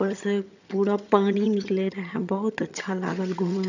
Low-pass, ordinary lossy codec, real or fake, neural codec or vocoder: 7.2 kHz; none; fake; vocoder, 22.05 kHz, 80 mel bands, HiFi-GAN